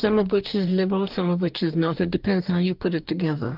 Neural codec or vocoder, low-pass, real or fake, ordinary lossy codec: codec, 44.1 kHz, 2.6 kbps, DAC; 5.4 kHz; fake; Opus, 24 kbps